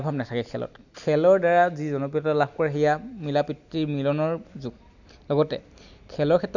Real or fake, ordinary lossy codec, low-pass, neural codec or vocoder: real; none; 7.2 kHz; none